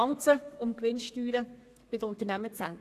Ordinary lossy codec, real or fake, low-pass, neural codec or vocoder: AAC, 64 kbps; fake; 14.4 kHz; codec, 32 kHz, 1.9 kbps, SNAC